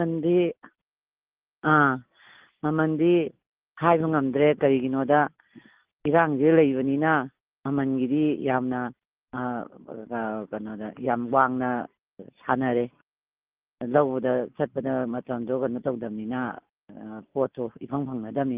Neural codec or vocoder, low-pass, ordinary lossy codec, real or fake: none; 3.6 kHz; Opus, 32 kbps; real